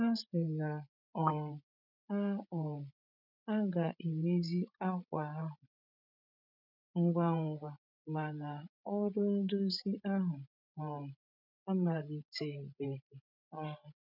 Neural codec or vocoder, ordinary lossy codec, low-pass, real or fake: codec, 16 kHz, 16 kbps, FreqCodec, larger model; none; 5.4 kHz; fake